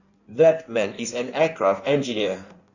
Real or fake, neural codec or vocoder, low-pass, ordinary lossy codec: fake; codec, 16 kHz in and 24 kHz out, 1.1 kbps, FireRedTTS-2 codec; 7.2 kHz; none